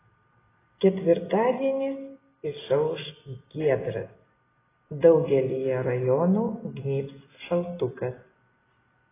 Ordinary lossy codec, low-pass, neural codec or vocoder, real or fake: AAC, 16 kbps; 3.6 kHz; none; real